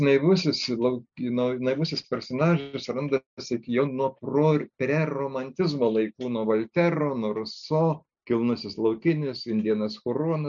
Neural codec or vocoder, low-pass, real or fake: none; 7.2 kHz; real